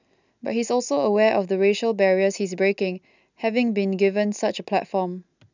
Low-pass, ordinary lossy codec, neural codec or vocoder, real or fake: 7.2 kHz; none; none; real